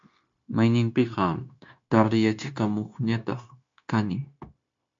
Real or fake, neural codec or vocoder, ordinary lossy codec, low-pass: fake; codec, 16 kHz, 0.9 kbps, LongCat-Audio-Codec; MP3, 48 kbps; 7.2 kHz